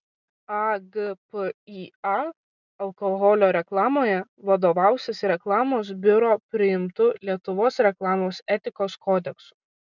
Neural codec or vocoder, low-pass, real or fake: none; 7.2 kHz; real